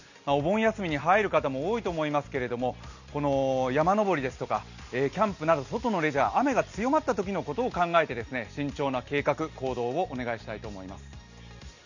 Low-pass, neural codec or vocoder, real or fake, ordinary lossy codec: 7.2 kHz; none; real; none